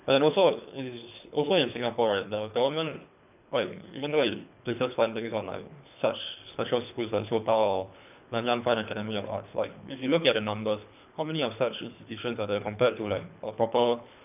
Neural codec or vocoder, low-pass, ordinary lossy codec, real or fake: codec, 24 kHz, 3 kbps, HILCodec; 3.6 kHz; none; fake